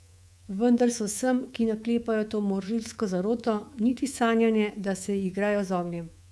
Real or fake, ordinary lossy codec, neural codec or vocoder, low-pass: fake; none; codec, 24 kHz, 3.1 kbps, DualCodec; none